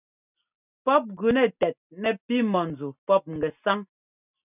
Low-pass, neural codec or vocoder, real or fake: 3.6 kHz; none; real